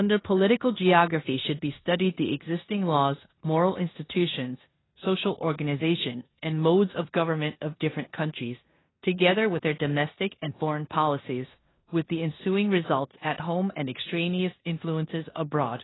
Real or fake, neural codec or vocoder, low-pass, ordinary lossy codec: fake; codec, 16 kHz in and 24 kHz out, 0.9 kbps, LongCat-Audio-Codec, four codebook decoder; 7.2 kHz; AAC, 16 kbps